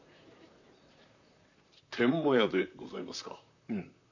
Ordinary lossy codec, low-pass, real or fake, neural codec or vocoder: none; 7.2 kHz; fake; vocoder, 44.1 kHz, 128 mel bands, Pupu-Vocoder